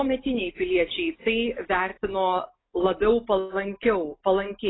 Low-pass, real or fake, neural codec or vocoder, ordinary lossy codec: 7.2 kHz; real; none; AAC, 16 kbps